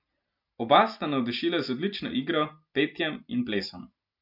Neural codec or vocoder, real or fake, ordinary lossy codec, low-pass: none; real; none; 5.4 kHz